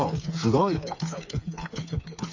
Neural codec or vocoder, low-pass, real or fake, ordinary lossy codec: codec, 16 kHz, 4 kbps, FreqCodec, smaller model; 7.2 kHz; fake; AAC, 48 kbps